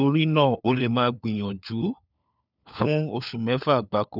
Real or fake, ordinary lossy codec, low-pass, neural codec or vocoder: fake; none; 5.4 kHz; codec, 16 kHz, 4 kbps, FunCodec, trained on LibriTTS, 50 frames a second